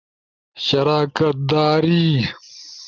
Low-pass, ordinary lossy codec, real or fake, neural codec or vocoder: 7.2 kHz; Opus, 32 kbps; real; none